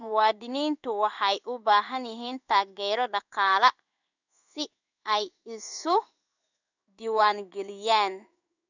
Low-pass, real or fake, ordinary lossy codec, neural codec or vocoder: 7.2 kHz; fake; none; codec, 16 kHz in and 24 kHz out, 1 kbps, XY-Tokenizer